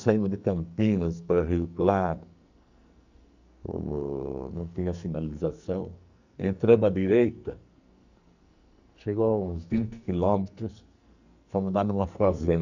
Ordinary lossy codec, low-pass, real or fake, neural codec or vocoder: none; 7.2 kHz; fake; codec, 44.1 kHz, 2.6 kbps, SNAC